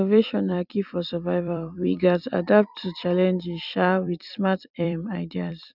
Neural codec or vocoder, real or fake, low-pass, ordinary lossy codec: vocoder, 44.1 kHz, 128 mel bands every 256 samples, BigVGAN v2; fake; 5.4 kHz; none